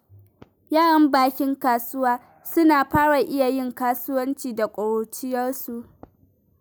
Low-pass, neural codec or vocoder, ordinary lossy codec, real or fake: none; none; none; real